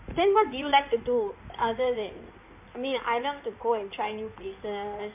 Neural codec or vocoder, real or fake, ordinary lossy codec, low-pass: codec, 16 kHz in and 24 kHz out, 2.2 kbps, FireRedTTS-2 codec; fake; MP3, 32 kbps; 3.6 kHz